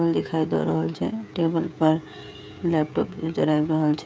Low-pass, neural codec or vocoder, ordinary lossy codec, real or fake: none; codec, 16 kHz, 16 kbps, FreqCodec, smaller model; none; fake